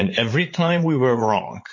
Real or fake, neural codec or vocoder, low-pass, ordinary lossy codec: fake; vocoder, 44.1 kHz, 80 mel bands, Vocos; 7.2 kHz; MP3, 32 kbps